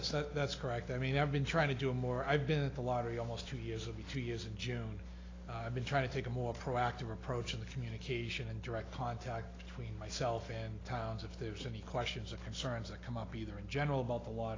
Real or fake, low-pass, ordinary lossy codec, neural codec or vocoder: real; 7.2 kHz; AAC, 32 kbps; none